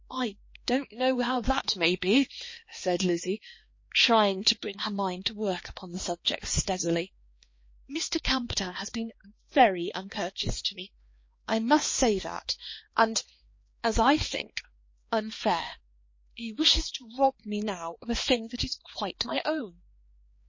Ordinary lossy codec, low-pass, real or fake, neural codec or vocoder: MP3, 32 kbps; 7.2 kHz; fake; codec, 16 kHz, 2 kbps, X-Codec, HuBERT features, trained on balanced general audio